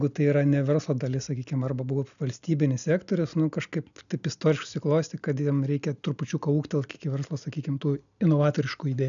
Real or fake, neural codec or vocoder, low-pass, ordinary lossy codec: real; none; 7.2 kHz; MP3, 96 kbps